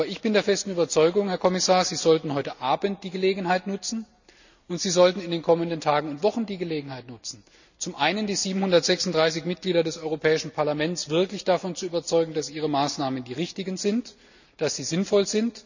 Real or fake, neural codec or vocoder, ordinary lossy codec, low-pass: real; none; none; 7.2 kHz